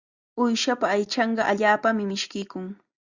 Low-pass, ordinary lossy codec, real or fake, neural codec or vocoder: 7.2 kHz; Opus, 64 kbps; real; none